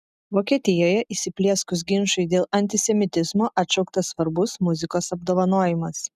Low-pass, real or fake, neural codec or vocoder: 14.4 kHz; real; none